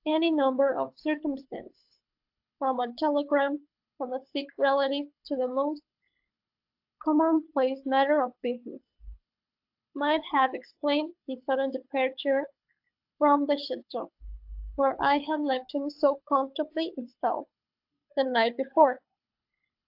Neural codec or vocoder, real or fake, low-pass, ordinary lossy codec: codec, 24 kHz, 6 kbps, HILCodec; fake; 5.4 kHz; AAC, 48 kbps